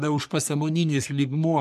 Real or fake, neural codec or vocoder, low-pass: fake; codec, 44.1 kHz, 3.4 kbps, Pupu-Codec; 14.4 kHz